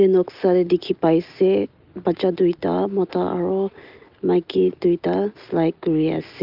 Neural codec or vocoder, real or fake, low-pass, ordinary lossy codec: none; real; 5.4 kHz; Opus, 32 kbps